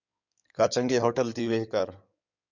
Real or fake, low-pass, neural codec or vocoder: fake; 7.2 kHz; codec, 16 kHz in and 24 kHz out, 2.2 kbps, FireRedTTS-2 codec